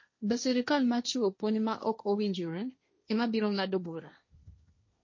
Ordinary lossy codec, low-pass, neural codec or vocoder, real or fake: MP3, 32 kbps; 7.2 kHz; codec, 16 kHz, 1.1 kbps, Voila-Tokenizer; fake